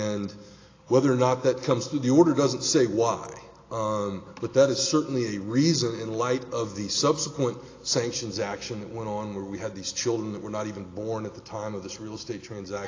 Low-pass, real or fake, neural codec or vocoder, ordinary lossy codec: 7.2 kHz; real; none; AAC, 32 kbps